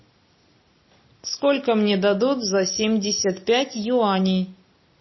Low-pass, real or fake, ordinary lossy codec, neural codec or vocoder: 7.2 kHz; real; MP3, 24 kbps; none